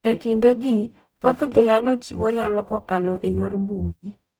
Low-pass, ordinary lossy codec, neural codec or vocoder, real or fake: none; none; codec, 44.1 kHz, 0.9 kbps, DAC; fake